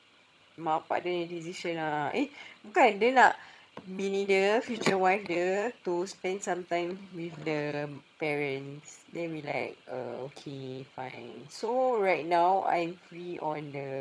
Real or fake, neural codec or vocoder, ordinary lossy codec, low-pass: fake; vocoder, 22.05 kHz, 80 mel bands, HiFi-GAN; none; none